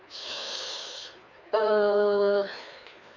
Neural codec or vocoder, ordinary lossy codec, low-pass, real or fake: codec, 16 kHz, 2 kbps, FreqCodec, larger model; none; 7.2 kHz; fake